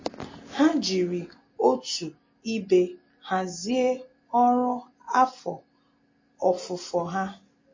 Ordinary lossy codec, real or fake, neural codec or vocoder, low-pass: MP3, 32 kbps; real; none; 7.2 kHz